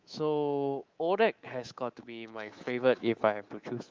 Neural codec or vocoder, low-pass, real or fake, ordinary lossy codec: codec, 24 kHz, 3.1 kbps, DualCodec; 7.2 kHz; fake; Opus, 24 kbps